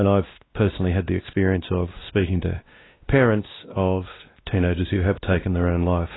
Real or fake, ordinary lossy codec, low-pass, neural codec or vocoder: fake; AAC, 16 kbps; 7.2 kHz; codec, 16 kHz, 1 kbps, X-Codec, WavLM features, trained on Multilingual LibriSpeech